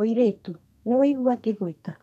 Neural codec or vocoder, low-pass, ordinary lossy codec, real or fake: codec, 32 kHz, 1.9 kbps, SNAC; 14.4 kHz; none; fake